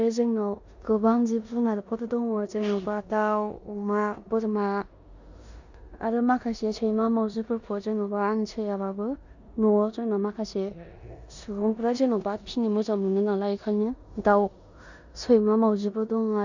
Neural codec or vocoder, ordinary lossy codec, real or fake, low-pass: codec, 16 kHz in and 24 kHz out, 0.9 kbps, LongCat-Audio-Codec, four codebook decoder; Opus, 64 kbps; fake; 7.2 kHz